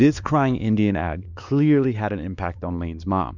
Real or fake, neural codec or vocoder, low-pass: fake; codec, 16 kHz, 2 kbps, X-Codec, WavLM features, trained on Multilingual LibriSpeech; 7.2 kHz